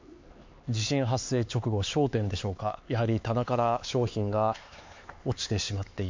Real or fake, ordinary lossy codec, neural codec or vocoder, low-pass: fake; MP3, 48 kbps; codec, 16 kHz, 4 kbps, X-Codec, HuBERT features, trained on LibriSpeech; 7.2 kHz